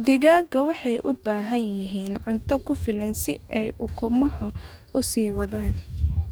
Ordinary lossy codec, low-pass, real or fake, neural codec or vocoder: none; none; fake; codec, 44.1 kHz, 2.6 kbps, DAC